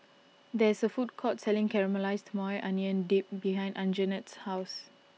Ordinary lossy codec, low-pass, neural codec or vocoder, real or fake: none; none; none; real